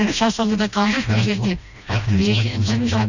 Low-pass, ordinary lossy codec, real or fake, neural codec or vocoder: 7.2 kHz; none; fake; codec, 16 kHz, 1 kbps, FreqCodec, smaller model